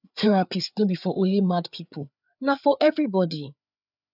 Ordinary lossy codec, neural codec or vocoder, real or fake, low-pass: none; codec, 16 kHz, 4 kbps, FreqCodec, larger model; fake; 5.4 kHz